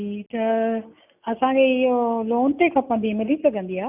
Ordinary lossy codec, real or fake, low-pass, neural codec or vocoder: none; real; 3.6 kHz; none